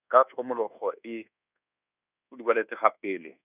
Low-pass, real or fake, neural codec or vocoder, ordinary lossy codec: 3.6 kHz; fake; codec, 24 kHz, 1.2 kbps, DualCodec; none